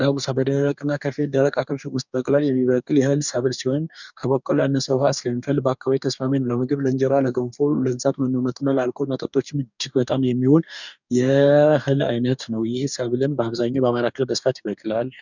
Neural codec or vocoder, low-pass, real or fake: codec, 44.1 kHz, 2.6 kbps, DAC; 7.2 kHz; fake